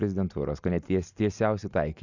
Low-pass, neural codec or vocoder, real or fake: 7.2 kHz; none; real